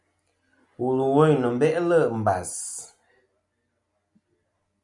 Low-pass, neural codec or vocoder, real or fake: 10.8 kHz; none; real